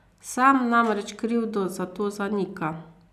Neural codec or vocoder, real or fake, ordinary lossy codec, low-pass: none; real; none; 14.4 kHz